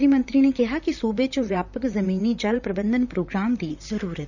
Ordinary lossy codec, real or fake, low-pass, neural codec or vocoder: none; fake; 7.2 kHz; vocoder, 44.1 kHz, 128 mel bands, Pupu-Vocoder